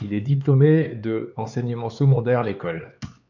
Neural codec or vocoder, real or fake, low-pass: codec, 16 kHz, 4 kbps, X-Codec, HuBERT features, trained on LibriSpeech; fake; 7.2 kHz